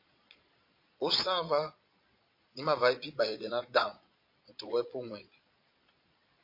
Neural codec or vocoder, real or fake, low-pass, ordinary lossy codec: vocoder, 22.05 kHz, 80 mel bands, Vocos; fake; 5.4 kHz; MP3, 32 kbps